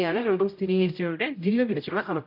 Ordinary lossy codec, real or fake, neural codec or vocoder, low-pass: none; fake; codec, 16 kHz, 0.5 kbps, X-Codec, HuBERT features, trained on general audio; 5.4 kHz